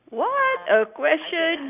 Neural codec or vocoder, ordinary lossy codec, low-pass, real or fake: none; none; 3.6 kHz; real